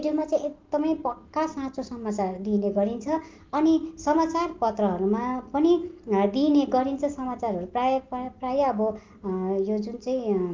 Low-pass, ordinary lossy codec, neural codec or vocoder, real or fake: 7.2 kHz; Opus, 24 kbps; none; real